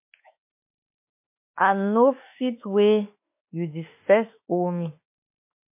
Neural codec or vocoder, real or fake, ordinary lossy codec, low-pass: autoencoder, 48 kHz, 32 numbers a frame, DAC-VAE, trained on Japanese speech; fake; MP3, 24 kbps; 3.6 kHz